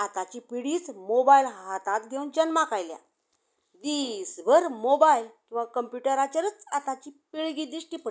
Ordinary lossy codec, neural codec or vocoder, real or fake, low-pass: none; none; real; none